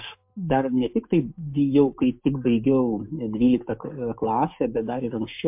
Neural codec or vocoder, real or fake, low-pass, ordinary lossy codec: codec, 16 kHz, 6 kbps, DAC; fake; 3.6 kHz; MP3, 32 kbps